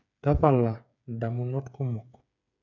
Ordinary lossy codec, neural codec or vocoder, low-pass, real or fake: none; codec, 16 kHz, 8 kbps, FreqCodec, smaller model; 7.2 kHz; fake